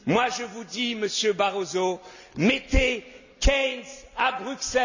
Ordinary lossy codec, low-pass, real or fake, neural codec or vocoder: none; 7.2 kHz; real; none